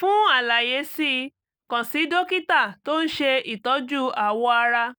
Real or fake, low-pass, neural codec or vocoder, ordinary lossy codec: real; none; none; none